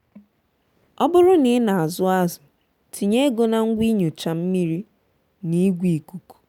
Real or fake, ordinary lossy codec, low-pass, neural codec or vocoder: real; none; 19.8 kHz; none